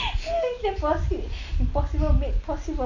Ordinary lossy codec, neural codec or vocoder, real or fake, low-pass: none; none; real; 7.2 kHz